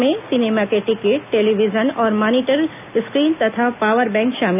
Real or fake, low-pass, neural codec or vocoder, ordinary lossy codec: real; 3.6 kHz; none; none